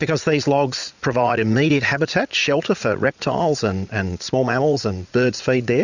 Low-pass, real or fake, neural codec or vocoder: 7.2 kHz; fake; vocoder, 44.1 kHz, 80 mel bands, Vocos